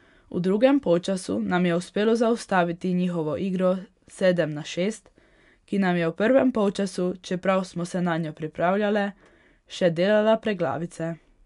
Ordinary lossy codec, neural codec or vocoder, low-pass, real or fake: none; none; 10.8 kHz; real